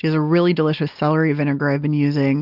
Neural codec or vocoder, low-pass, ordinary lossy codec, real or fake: none; 5.4 kHz; Opus, 32 kbps; real